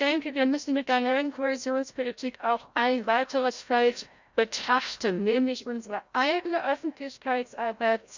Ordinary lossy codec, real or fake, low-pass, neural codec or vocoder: Opus, 64 kbps; fake; 7.2 kHz; codec, 16 kHz, 0.5 kbps, FreqCodec, larger model